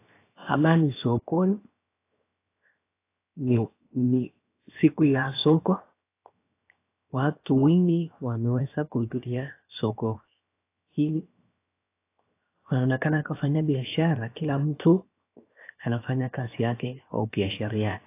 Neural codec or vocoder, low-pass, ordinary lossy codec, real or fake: codec, 16 kHz, 0.7 kbps, FocalCodec; 3.6 kHz; AAC, 24 kbps; fake